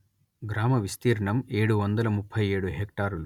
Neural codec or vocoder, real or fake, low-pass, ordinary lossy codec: none; real; 19.8 kHz; none